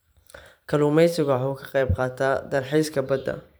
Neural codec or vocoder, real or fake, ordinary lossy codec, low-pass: vocoder, 44.1 kHz, 128 mel bands every 512 samples, BigVGAN v2; fake; none; none